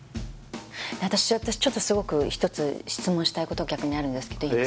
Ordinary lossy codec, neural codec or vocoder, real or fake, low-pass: none; none; real; none